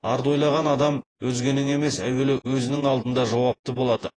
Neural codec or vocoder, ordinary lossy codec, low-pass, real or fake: vocoder, 48 kHz, 128 mel bands, Vocos; AAC, 32 kbps; 9.9 kHz; fake